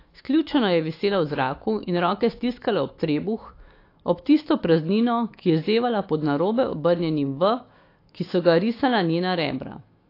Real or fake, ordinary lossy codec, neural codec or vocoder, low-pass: fake; AAC, 32 kbps; autoencoder, 48 kHz, 128 numbers a frame, DAC-VAE, trained on Japanese speech; 5.4 kHz